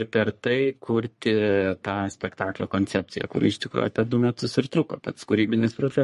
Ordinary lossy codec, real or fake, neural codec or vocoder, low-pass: MP3, 48 kbps; fake; codec, 44.1 kHz, 3.4 kbps, Pupu-Codec; 14.4 kHz